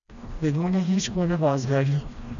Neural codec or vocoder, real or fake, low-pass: codec, 16 kHz, 1 kbps, FreqCodec, smaller model; fake; 7.2 kHz